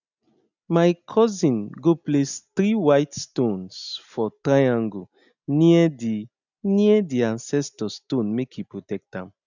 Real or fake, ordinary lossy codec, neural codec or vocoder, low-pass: real; none; none; 7.2 kHz